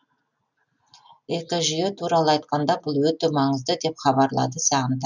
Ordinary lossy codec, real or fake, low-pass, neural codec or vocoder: none; real; 7.2 kHz; none